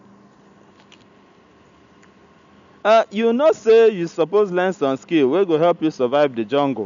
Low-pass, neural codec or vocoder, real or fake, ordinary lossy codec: 7.2 kHz; none; real; MP3, 96 kbps